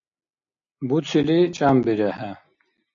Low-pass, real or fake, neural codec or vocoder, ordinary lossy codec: 7.2 kHz; real; none; AAC, 64 kbps